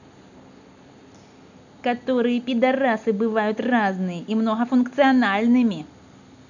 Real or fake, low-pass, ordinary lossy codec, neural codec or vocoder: real; 7.2 kHz; none; none